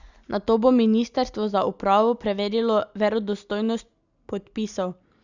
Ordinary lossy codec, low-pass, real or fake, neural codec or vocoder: Opus, 64 kbps; 7.2 kHz; real; none